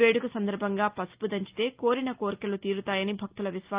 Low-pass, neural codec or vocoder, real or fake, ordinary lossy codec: 3.6 kHz; none; real; Opus, 32 kbps